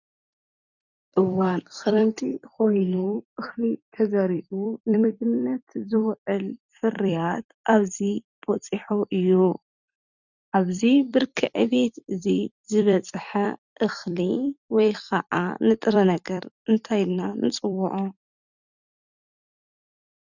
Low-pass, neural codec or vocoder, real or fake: 7.2 kHz; vocoder, 44.1 kHz, 128 mel bands every 256 samples, BigVGAN v2; fake